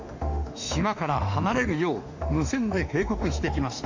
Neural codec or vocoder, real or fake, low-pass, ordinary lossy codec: autoencoder, 48 kHz, 32 numbers a frame, DAC-VAE, trained on Japanese speech; fake; 7.2 kHz; AAC, 48 kbps